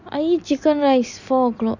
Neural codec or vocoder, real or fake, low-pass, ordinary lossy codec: none; real; 7.2 kHz; none